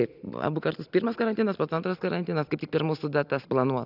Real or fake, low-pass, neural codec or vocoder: real; 5.4 kHz; none